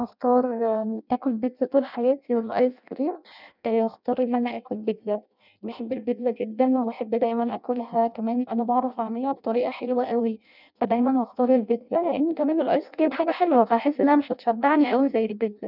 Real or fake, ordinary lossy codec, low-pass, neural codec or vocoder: fake; none; 5.4 kHz; codec, 16 kHz in and 24 kHz out, 0.6 kbps, FireRedTTS-2 codec